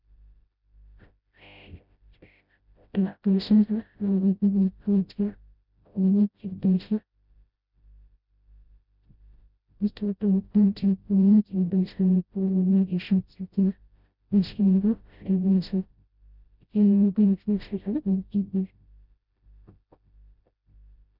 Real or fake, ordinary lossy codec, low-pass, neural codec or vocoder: fake; none; 5.4 kHz; codec, 16 kHz, 0.5 kbps, FreqCodec, smaller model